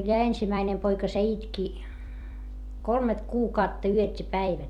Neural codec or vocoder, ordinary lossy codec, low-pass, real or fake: vocoder, 44.1 kHz, 128 mel bands every 256 samples, BigVGAN v2; none; 19.8 kHz; fake